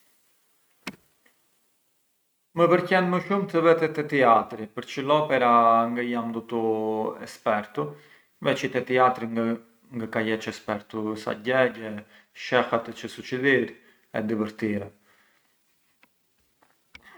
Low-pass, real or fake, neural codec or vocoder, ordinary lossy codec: none; real; none; none